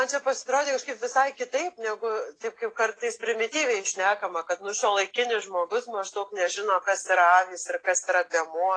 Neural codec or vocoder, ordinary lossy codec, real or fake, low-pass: none; AAC, 32 kbps; real; 9.9 kHz